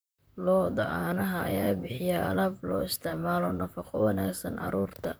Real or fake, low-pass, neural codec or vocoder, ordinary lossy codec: fake; none; vocoder, 44.1 kHz, 128 mel bands, Pupu-Vocoder; none